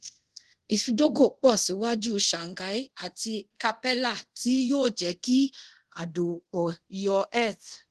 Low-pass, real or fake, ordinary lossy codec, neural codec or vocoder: 10.8 kHz; fake; Opus, 16 kbps; codec, 24 kHz, 0.5 kbps, DualCodec